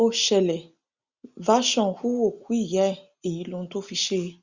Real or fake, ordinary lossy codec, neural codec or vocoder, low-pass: real; Opus, 64 kbps; none; 7.2 kHz